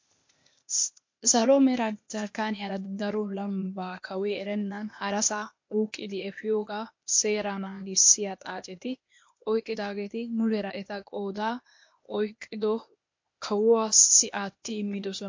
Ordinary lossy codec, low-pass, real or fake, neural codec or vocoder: MP3, 48 kbps; 7.2 kHz; fake; codec, 16 kHz, 0.8 kbps, ZipCodec